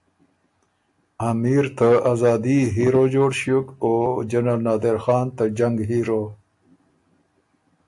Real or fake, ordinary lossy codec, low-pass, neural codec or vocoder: real; AAC, 64 kbps; 10.8 kHz; none